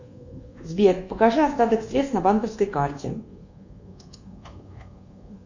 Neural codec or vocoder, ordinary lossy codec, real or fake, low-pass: codec, 24 kHz, 1.2 kbps, DualCodec; Opus, 64 kbps; fake; 7.2 kHz